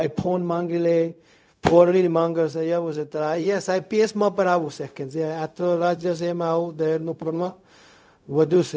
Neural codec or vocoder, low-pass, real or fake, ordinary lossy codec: codec, 16 kHz, 0.4 kbps, LongCat-Audio-Codec; none; fake; none